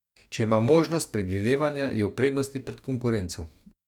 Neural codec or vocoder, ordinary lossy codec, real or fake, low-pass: codec, 44.1 kHz, 2.6 kbps, DAC; none; fake; 19.8 kHz